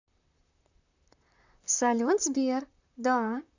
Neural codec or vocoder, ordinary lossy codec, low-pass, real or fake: vocoder, 44.1 kHz, 128 mel bands, Pupu-Vocoder; none; 7.2 kHz; fake